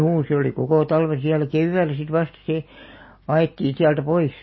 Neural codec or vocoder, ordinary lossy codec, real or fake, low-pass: none; MP3, 24 kbps; real; 7.2 kHz